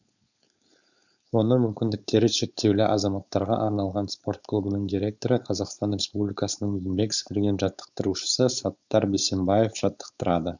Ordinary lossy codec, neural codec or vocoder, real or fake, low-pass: none; codec, 16 kHz, 4.8 kbps, FACodec; fake; 7.2 kHz